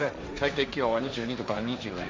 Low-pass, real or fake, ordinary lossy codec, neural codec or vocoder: none; fake; none; codec, 16 kHz, 1.1 kbps, Voila-Tokenizer